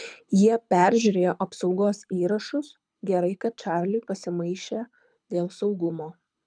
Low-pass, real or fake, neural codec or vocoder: 9.9 kHz; fake; codec, 24 kHz, 6 kbps, HILCodec